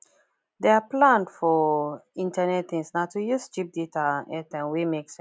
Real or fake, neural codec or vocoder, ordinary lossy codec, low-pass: real; none; none; none